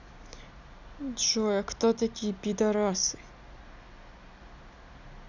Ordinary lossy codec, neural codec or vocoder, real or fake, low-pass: none; none; real; 7.2 kHz